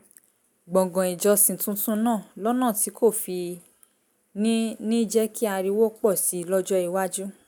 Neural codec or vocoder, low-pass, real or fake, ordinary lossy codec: none; none; real; none